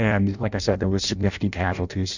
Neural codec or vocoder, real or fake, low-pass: codec, 16 kHz in and 24 kHz out, 0.6 kbps, FireRedTTS-2 codec; fake; 7.2 kHz